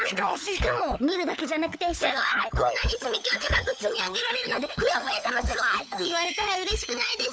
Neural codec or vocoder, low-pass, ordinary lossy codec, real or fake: codec, 16 kHz, 4 kbps, FunCodec, trained on LibriTTS, 50 frames a second; none; none; fake